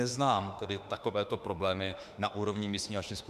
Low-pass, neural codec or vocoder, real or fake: 14.4 kHz; autoencoder, 48 kHz, 32 numbers a frame, DAC-VAE, trained on Japanese speech; fake